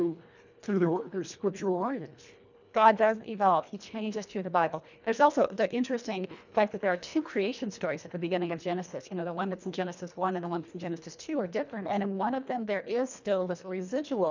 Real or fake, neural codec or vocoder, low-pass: fake; codec, 24 kHz, 1.5 kbps, HILCodec; 7.2 kHz